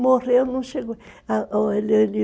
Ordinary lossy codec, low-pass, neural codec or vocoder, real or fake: none; none; none; real